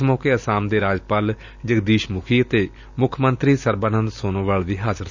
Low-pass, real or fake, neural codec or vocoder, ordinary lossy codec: 7.2 kHz; real; none; none